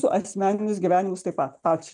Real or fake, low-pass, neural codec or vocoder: real; 10.8 kHz; none